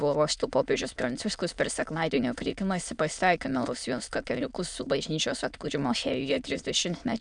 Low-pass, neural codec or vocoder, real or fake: 9.9 kHz; autoencoder, 22.05 kHz, a latent of 192 numbers a frame, VITS, trained on many speakers; fake